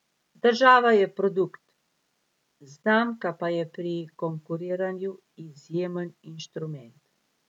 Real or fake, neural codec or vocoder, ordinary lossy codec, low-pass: real; none; none; 19.8 kHz